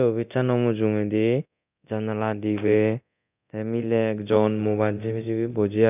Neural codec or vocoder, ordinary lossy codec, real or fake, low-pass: codec, 24 kHz, 0.9 kbps, DualCodec; none; fake; 3.6 kHz